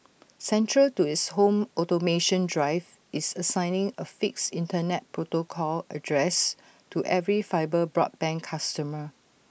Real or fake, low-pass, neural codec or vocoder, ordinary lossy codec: real; none; none; none